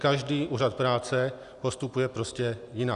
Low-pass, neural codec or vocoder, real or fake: 10.8 kHz; none; real